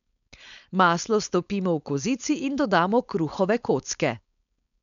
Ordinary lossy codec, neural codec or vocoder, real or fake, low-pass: none; codec, 16 kHz, 4.8 kbps, FACodec; fake; 7.2 kHz